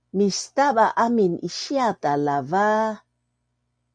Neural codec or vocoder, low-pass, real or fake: none; 9.9 kHz; real